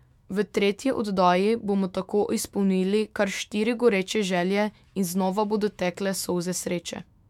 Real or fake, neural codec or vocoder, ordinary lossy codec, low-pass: fake; autoencoder, 48 kHz, 128 numbers a frame, DAC-VAE, trained on Japanese speech; MP3, 96 kbps; 19.8 kHz